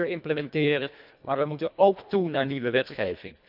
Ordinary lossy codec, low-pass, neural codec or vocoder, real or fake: none; 5.4 kHz; codec, 24 kHz, 1.5 kbps, HILCodec; fake